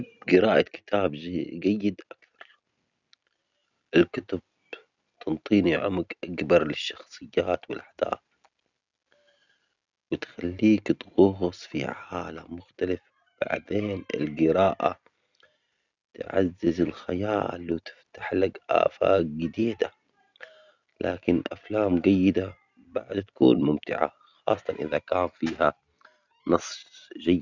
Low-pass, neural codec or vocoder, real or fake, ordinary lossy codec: 7.2 kHz; none; real; none